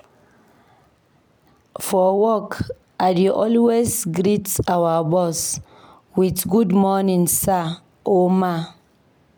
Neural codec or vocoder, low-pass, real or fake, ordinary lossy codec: none; none; real; none